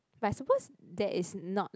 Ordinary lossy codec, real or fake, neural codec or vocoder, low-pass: none; real; none; none